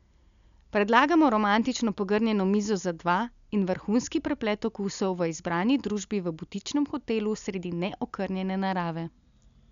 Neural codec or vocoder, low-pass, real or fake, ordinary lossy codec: none; 7.2 kHz; real; none